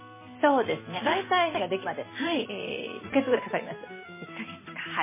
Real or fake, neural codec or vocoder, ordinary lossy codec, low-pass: real; none; MP3, 16 kbps; 3.6 kHz